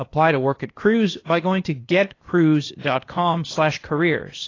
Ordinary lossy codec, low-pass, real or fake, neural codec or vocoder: AAC, 32 kbps; 7.2 kHz; fake; codec, 16 kHz, 0.8 kbps, ZipCodec